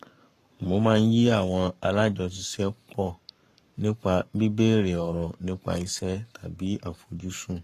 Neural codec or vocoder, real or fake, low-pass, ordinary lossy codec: codec, 44.1 kHz, 7.8 kbps, Pupu-Codec; fake; 14.4 kHz; AAC, 48 kbps